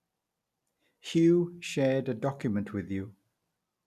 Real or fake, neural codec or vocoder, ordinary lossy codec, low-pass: real; none; none; 14.4 kHz